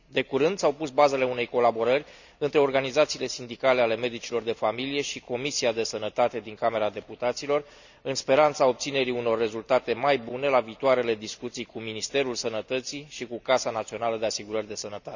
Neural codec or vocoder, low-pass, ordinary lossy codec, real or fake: none; 7.2 kHz; none; real